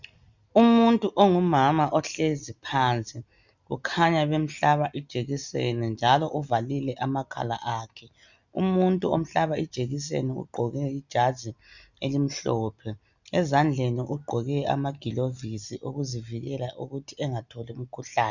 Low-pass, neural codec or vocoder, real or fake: 7.2 kHz; none; real